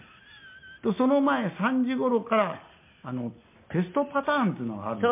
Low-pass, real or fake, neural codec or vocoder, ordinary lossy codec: 3.6 kHz; real; none; MP3, 24 kbps